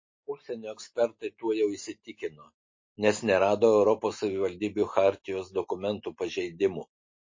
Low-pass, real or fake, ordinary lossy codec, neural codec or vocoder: 7.2 kHz; real; MP3, 32 kbps; none